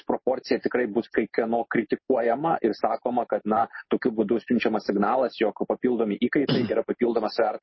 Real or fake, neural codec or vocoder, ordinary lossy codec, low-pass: real; none; MP3, 24 kbps; 7.2 kHz